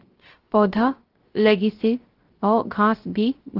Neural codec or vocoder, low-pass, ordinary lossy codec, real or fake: codec, 16 kHz, 0.3 kbps, FocalCodec; 5.4 kHz; Opus, 16 kbps; fake